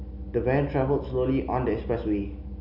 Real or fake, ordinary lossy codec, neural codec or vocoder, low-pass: real; none; none; 5.4 kHz